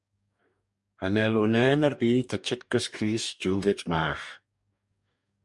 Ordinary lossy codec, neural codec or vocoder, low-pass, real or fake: MP3, 96 kbps; codec, 44.1 kHz, 2.6 kbps, DAC; 10.8 kHz; fake